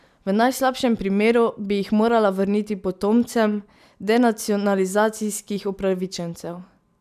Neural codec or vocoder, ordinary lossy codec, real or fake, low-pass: none; none; real; 14.4 kHz